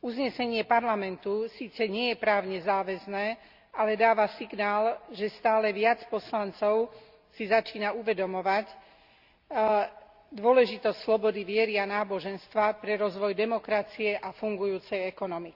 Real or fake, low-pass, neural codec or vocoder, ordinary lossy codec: real; 5.4 kHz; none; Opus, 64 kbps